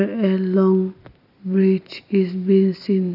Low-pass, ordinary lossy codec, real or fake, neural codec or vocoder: 5.4 kHz; none; real; none